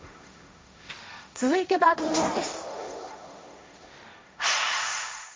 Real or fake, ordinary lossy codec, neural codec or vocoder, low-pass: fake; none; codec, 16 kHz, 1.1 kbps, Voila-Tokenizer; none